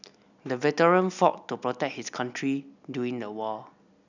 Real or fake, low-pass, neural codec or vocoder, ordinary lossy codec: real; 7.2 kHz; none; none